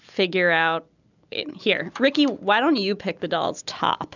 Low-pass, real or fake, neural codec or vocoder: 7.2 kHz; real; none